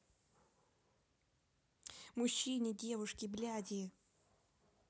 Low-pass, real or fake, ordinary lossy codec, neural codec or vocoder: none; real; none; none